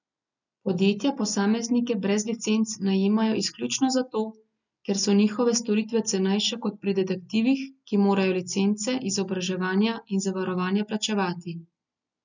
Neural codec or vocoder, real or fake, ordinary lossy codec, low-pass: none; real; none; 7.2 kHz